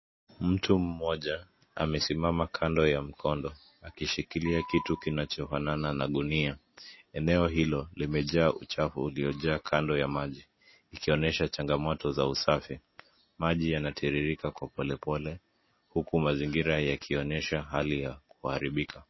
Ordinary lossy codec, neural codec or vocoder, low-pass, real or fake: MP3, 24 kbps; none; 7.2 kHz; real